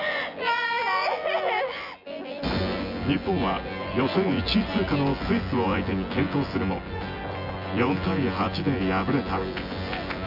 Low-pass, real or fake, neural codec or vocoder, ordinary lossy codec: 5.4 kHz; fake; vocoder, 24 kHz, 100 mel bands, Vocos; none